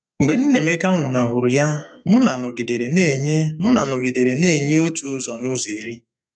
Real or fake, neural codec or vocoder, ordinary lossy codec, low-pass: fake; codec, 32 kHz, 1.9 kbps, SNAC; none; 9.9 kHz